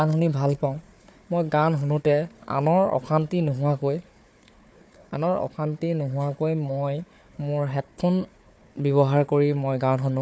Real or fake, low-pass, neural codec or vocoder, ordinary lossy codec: fake; none; codec, 16 kHz, 4 kbps, FunCodec, trained on Chinese and English, 50 frames a second; none